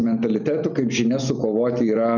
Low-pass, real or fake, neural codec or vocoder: 7.2 kHz; real; none